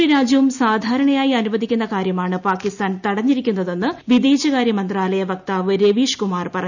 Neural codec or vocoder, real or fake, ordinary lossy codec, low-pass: none; real; none; 7.2 kHz